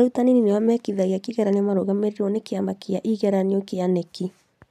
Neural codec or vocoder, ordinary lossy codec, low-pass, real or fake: none; none; 14.4 kHz; real